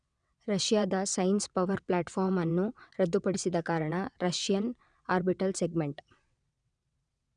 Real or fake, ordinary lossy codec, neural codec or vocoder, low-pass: fake; none; vocoder, 22.05 kHz, 80 mel bands, WaveNeXt; 9.9 kHz